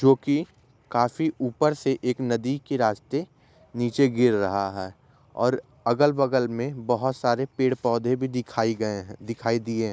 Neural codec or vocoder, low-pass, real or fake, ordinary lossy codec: none; none; real; none